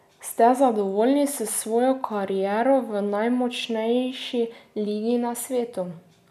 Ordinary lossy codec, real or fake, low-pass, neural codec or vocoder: none; real; 14.4 kHz; none